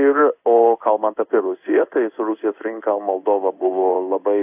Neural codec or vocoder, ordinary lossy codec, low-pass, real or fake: codec, 16 kHz in and 24 kHz out, 1 kbps, XY-Tokenizer; AAC, 32 kbps; 3.6 kHz; fake